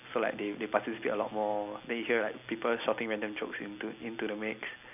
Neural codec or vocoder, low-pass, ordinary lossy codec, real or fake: none; 3.6 kHz; none; real